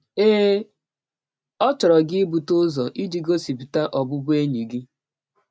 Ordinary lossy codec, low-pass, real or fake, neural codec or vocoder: none; none; real; none